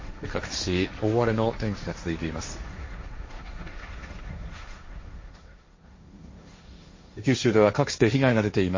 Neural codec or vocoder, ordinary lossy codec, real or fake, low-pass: codec, 16 kHz, 1.1 kbps, Voila-Tokenizer; MP3, 32 kbps; fake; 7.2 kHz